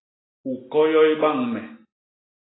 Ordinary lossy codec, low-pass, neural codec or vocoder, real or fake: AAC, 16 kbps; 7.2 kHz; none; real